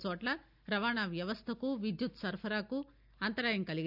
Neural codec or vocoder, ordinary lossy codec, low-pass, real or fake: none; none; 5.4 kHz; real